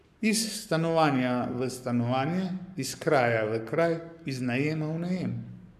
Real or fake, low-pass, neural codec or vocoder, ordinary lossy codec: fake; 14.4 kHz; codec, 44.1 kHz, 7.8 kbps, Pupu-Codec; none